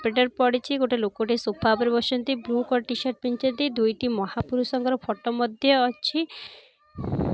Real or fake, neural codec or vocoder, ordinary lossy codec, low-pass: real; none; none; none